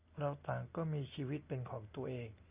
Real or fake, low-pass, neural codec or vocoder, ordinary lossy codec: real; 3.6 kHz; none; Opus, 64 kbps